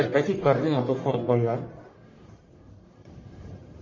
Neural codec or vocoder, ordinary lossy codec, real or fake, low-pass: codec, 44.1 kHz, 1.7 kbps, Pupu-Codec; MP3, 32 kbps; fake; 7.2 kHz